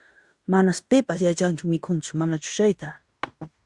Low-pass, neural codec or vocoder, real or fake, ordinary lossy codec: 10.8 kHz; codec, 16 kHz in and 24 kHz out, 0.9 kbps, LongCat-Audio-Codec, fine tuned four codebook decoder; fake; Opus, 64 kbps